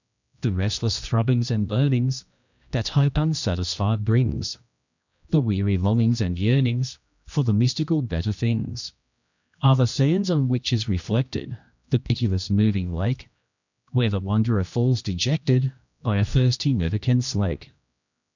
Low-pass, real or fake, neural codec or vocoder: 7.2 kHz; fake; codec, 16 kHz, 1 kbps, X-Codec, HuBERT features, trained on general audio